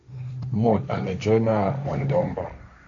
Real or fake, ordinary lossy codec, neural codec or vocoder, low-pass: fake; AAC, 48 kbps; codec, 16 kHz, 1.1 kbps, Voila-Tokenizer; 7.2 kHz